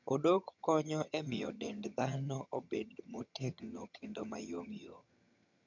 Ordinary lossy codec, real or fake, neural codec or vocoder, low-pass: none; fake; vocoder, 22.05 kHz, 80 mel bands, HiFi-GAN; 7.2 kHz